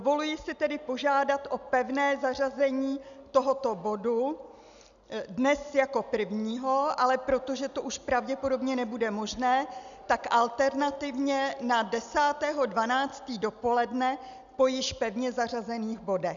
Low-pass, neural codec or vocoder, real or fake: 7.2 kHz; none; real